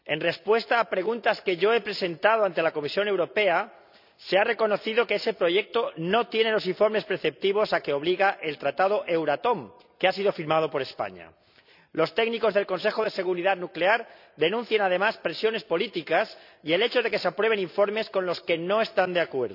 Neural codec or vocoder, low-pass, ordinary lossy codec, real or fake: none; 5.4 kHz; none; real